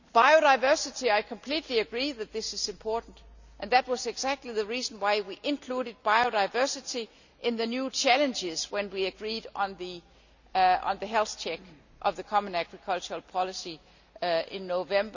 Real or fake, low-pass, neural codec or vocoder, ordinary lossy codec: real; 7.2 kHz; none; none